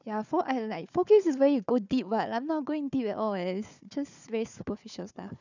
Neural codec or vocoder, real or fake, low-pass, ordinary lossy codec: codec, 16 kHz, 4 kbps, FunCodec, trained on Chinese and English, 50 frames a second; fake; 7.2 kHz; none